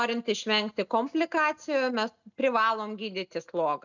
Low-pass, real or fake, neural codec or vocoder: 7.2 kHz; real; none